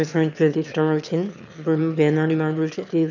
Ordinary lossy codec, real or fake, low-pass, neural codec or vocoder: none; fake; 7.2 kHz; autoencoder, 22.05 kHz, a latent of 192 numbers a frame, VITS, trained on one speaker